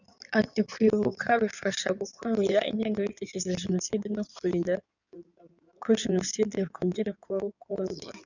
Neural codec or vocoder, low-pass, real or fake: codec, 16 kHz in and 24 kHz out, 2.2 kbps, FireRedTTS-2 codec; 7.2 kHz; fake